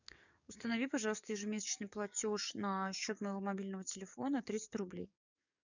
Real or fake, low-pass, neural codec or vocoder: fake; 7.2 kHz; codec, 44.1 kHz, 7.8 kbps, DAC